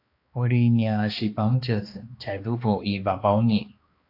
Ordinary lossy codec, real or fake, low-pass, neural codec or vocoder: AAC, 32 kbps; fake; 5.4 kHz; codec, 16 kHz, 2 kbps, X-Codec, HuBERT features, trained on balanced general audio